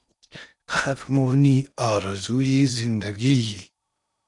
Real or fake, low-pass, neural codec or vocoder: fake; 10.8 kHz; codec, 16 kHz in and 24 kHz out, 0.6 kbps, FocalCodec, streaming, 4096 codes